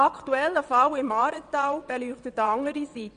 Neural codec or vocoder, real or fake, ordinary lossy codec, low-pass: vocoder, 22.05 kHz, 80 mel bands, WaveNeXt; fake; none; 9.9 kHz